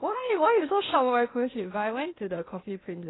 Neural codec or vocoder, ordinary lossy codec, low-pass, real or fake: codec, 16 kHz, 0.8 kbps, ZipCodec; AAC, 16 kbps; 7.2 kHz; fake